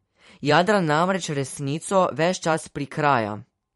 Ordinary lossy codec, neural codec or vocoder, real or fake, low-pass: MP3, 48 kbps; vocoder, 44.1 kHz, 128 mel bands every 512 samples, BigVGAN v2; fake; 19.8 kHz